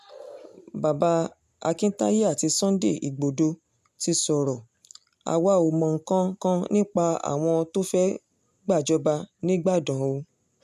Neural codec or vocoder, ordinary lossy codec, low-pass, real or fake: none; none; 14.4 kHz; real